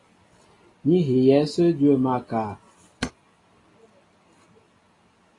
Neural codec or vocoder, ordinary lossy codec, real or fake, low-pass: none; AAC, 32 kbps; real; 10.8 kHz